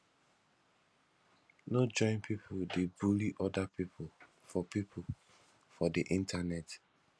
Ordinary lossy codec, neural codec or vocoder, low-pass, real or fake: none; none; none; real